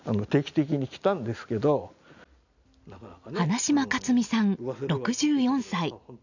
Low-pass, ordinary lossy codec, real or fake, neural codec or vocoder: 7.2 kHz; none; real; none